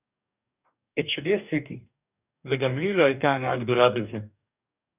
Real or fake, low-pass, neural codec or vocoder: fake; 3.6 kHz; codec, 44.1 kHz, 2.6 kbps, DAC